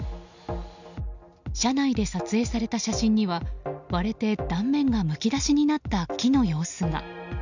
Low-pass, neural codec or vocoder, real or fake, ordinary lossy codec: 7.2 kHz; none; real; none